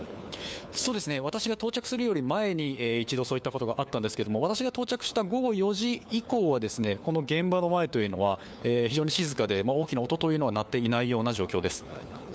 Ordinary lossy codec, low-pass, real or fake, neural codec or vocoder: none; none; fake; codec, 16 kHz, 4 kbps, FunCodec, trained on LibriTTS, 50 frames a second